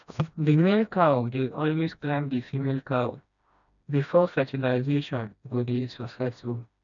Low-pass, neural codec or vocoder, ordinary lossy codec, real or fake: 7.2 kHz; codec, 16 kHz, 1 kbps, FreqCodec, smaller model; none; fake